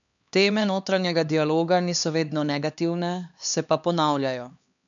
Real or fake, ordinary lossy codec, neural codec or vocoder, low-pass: fake; none; codec, 16 kHz, 2 kbps, X-Codec, HuBERT features, trained on LibriSpeech; 7.2 kHz